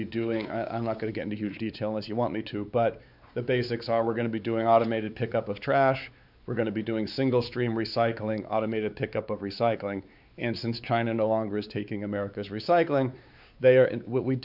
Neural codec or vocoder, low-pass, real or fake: codec, 16 kHz, 4 kbps, X-Codec, WavLM features, trained on Multilingual LibriSpeech; 5.4 kHz; fake